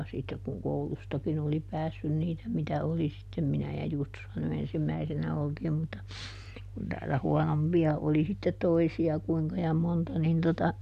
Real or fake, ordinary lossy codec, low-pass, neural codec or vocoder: real; none; 14.4 kHz; none